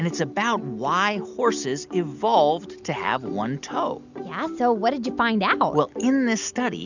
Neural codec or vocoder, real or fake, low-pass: none; real; 7.2 kHz